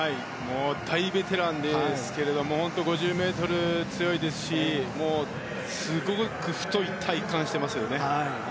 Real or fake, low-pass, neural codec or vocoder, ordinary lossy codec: real; none; none; none